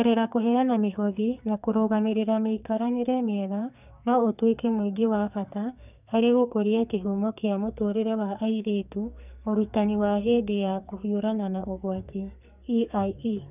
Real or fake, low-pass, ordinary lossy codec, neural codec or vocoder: fake; 3.6 kHz; none; codec, 44.1 kHz, 2.6 kbps, SNAC